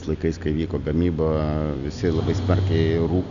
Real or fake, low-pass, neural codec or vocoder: real; 7.2 kHz; none